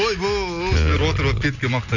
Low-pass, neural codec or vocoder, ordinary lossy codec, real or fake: 7.2 kHz; none; none; real